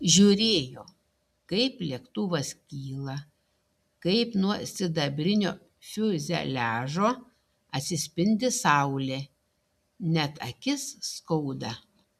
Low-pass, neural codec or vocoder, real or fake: 14.4 kHz; none; real